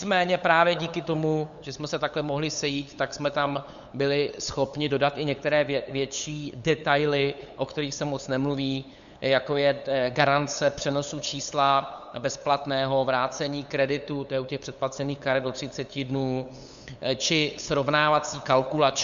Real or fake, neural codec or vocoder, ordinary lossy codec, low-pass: fake; codec, 16 kHz, 8 kbps, FunCodec, trained on LibriTTS, 25 frames a second; Opus, 64 kbps; 7.2 kHz